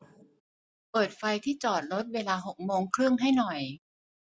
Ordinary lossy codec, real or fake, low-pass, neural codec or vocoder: none; real; none; none